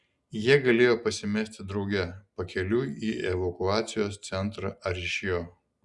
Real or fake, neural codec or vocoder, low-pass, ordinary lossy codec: real; none; 10.8 kHz; Opus, 64 kbps